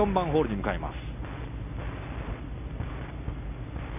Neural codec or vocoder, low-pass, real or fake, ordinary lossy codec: none; 3.6 kHz; real; none